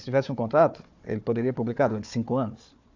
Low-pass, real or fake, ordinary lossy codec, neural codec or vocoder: 7.2 kHz; fake; AAC, 48 kbps; codec, 16 kHz, 4 kbps, FunCodec, trained on Chinese and English, 50 frames a second